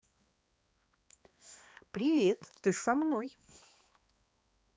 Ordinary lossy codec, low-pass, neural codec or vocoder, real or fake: none; none; codec, 16 kHz, 2 kbps, X-Codec, HuBERT features, trained on balanced general audio; fake